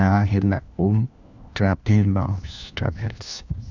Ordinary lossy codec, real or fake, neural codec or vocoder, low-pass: none; fake; codec, 16 kHz, 1 kbps, FunCodec, trained on LibriTTS, 50 frames a second; 7.2 kHz